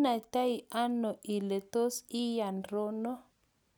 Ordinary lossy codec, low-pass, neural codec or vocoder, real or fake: none; none; none; real